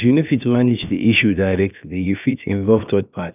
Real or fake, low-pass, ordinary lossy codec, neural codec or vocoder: fake; 3.6 kHz; none; codec, 16 kHz, about 1 kbps, DyCAST, with the encoder's durations